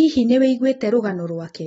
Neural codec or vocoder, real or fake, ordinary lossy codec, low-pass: none; real; AAC, 24 kbps; 19.8 kHz